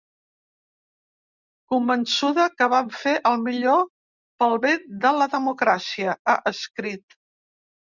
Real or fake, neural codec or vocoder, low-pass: real; none; 7.2 kHz